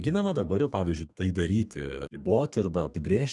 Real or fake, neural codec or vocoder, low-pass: fake; codec, 32 kHz, 1.9 kbps, SNAC; 10.8 kHz